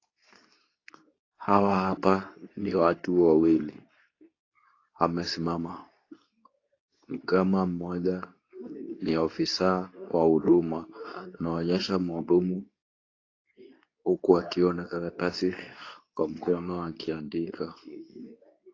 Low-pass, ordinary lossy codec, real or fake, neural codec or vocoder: 7.2 kHz; AAC, 32 kbps; fake; codec, 24 kHz, 0.9 kbps, WavTokenizer, medium speech release version 2